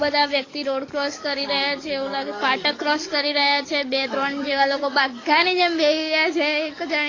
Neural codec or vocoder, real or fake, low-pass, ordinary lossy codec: codec, 44.1 kHz, 7.8 kbps, DAC; fake; 7.2 kHz; AAC, 32 kbps